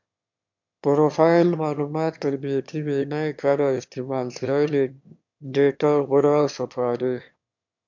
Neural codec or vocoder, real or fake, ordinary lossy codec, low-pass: autoencoder, 22.05 kHz, a latent of 192 numbers a frame, VITS, trained on one speaker; fake; MP3, 64 kbps; 7.2 kHz